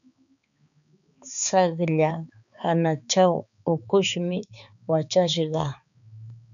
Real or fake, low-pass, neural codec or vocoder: fake; 7.2 kHz; codec, 16 kHz, 4 kbps, X-Codec, HuBERT features, trained on balanced general audio